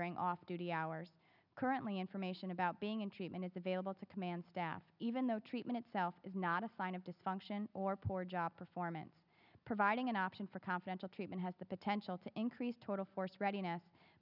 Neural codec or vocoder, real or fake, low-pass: none; real; 5.4 kHz